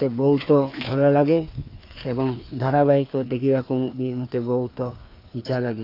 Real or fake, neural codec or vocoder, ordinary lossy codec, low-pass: fake; codec, 16 kHz, 2 kbps, FreqCodec, larger model; AAC, 32 kbps; 5.4 kHz